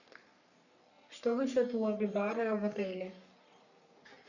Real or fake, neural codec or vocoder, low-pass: fake; codec, 44.1 kHz, 3.4 kbps, Pupu-Codec; 7.2 kHz